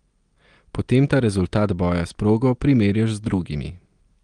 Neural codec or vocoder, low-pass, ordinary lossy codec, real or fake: none; 9.9 kHz; Opus, 24 kbps; real